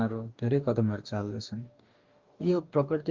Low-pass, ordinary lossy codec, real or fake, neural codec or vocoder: 7.2 kHz; Opus, 32 kbps; fake; codec, 44.1 kHz, 2.6 kbps, DAC